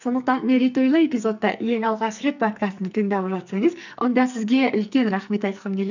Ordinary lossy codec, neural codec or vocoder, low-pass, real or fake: none; codec, 44.1 kHz, 2.6 kbps, SNAC; 7.2 kHz; fake